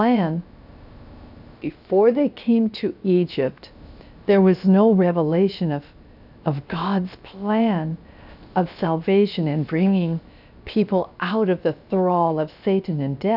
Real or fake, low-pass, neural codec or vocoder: fake; 5.4 kHz; codec, 16 kHz, about 1 kbps, DyCAST, with the encoder's durations